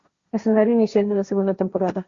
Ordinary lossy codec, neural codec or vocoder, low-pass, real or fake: Opus, 64 kbps; codec, 16 kHz, 1.1 kbps, Voila-Tokenizer; 7.2 kHz; fake